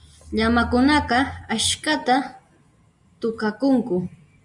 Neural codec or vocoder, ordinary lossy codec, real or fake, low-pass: none; Opus, 64 kbps; real; 10.8 kHz